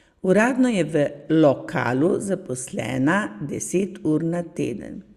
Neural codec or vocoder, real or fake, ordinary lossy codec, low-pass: none; real; Opus, 64 kbps; 14.4 kHz